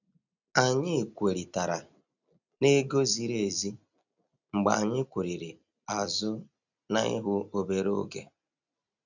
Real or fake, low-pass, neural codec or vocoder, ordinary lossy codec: real; 7.2 kHz; none; none